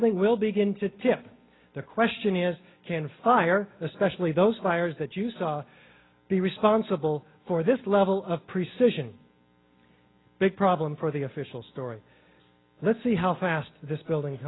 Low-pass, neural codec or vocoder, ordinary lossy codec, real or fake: 7.2 kHz; none; AAC, 16 kbps; real